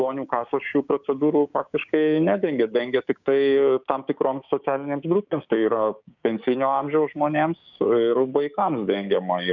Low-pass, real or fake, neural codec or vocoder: 7.2 kHz; fake; codec, 16 kHz, 6 kbps, DAC